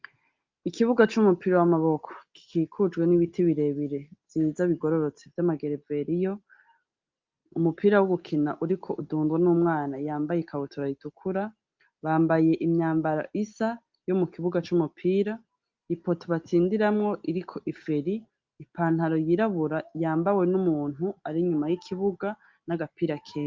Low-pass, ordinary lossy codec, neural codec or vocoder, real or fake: 7.2 kHz; Opus, 24 kbps; none; real